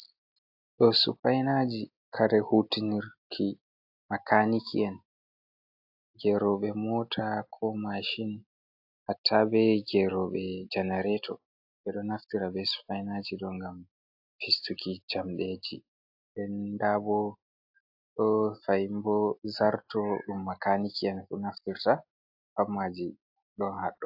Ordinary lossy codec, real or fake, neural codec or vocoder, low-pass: AAC, 48 kbps; real; none; 5.4 kHz